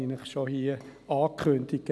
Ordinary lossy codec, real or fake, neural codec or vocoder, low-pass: none; real; none; none